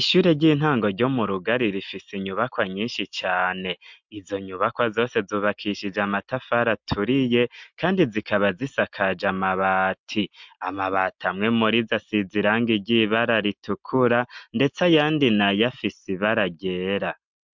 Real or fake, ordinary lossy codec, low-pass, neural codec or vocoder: real; MP3, 64 kbps; 7.2 kHz; none